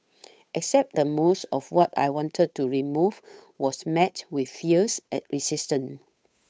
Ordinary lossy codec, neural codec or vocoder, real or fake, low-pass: none; codec, 16 kHz, 8 kbps, FunCodec, trained on Chinese and English, 25 frames a second; fake; none